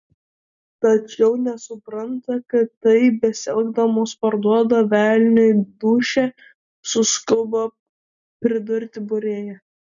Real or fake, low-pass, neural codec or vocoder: real; 7.2 kHz; none